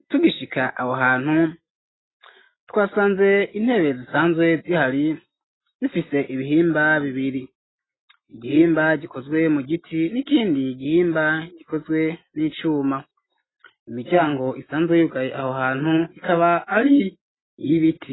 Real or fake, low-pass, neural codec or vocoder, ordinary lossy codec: real; 7.2 kHz; none; AAC, 16 kbps